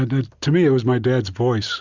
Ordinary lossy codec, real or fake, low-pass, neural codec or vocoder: Opus, 64 kbps; real; 7.2 kHz; none